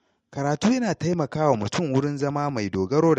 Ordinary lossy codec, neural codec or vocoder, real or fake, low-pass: MP3, 48 kbps; none; real; 14.4 kHz